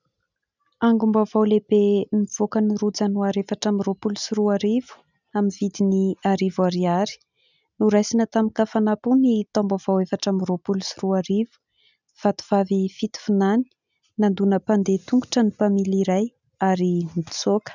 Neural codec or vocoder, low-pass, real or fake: none; 7.2 kHz; real